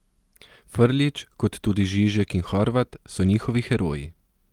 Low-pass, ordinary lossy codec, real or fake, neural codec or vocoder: 19.8 kHz; Opus, 24 kbps; real; none